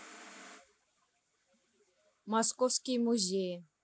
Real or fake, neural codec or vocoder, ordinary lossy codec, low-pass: real; none; none; none